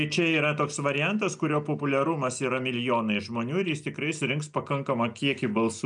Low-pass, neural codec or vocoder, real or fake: 9.9 kHz; none; real